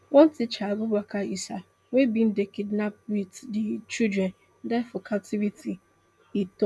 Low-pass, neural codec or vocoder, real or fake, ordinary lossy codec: none; none; real; none